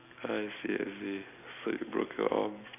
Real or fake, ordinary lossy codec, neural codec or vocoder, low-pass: real; none; none; 3.6 kHz